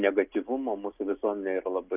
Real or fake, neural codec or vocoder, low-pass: real; none; 3.6 kHz